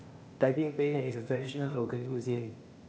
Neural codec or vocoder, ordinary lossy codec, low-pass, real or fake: codec, 16 kHz, 0.8 kbps, ZipCodec; none; none; fake